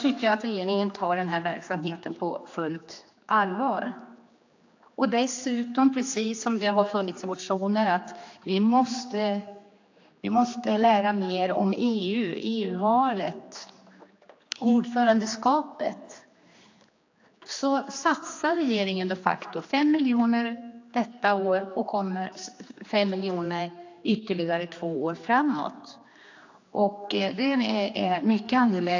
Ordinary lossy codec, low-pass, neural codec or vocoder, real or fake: AAC, 48 kbps; 7.2 kHz; codec, 16 kHz, 2 kbps, X-Codec, HuBERT features, trained on general audio; fake